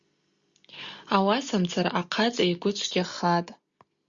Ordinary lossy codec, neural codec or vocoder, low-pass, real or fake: Opus, 64 kbps; none; 7.2 kHz; real